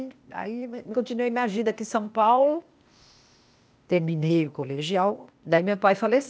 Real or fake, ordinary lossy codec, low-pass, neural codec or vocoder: fake; none; none; codec, 16 kHz, 0.8 kbps, ZipCodec